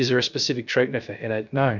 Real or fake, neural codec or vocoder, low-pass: fake; codec, 16 kHz, 0.3 kbps, FocalCodec; 7.2 kHz